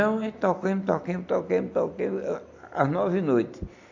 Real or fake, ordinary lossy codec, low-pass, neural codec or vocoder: real; AAC, 48 kbps; 7.2 kHz; none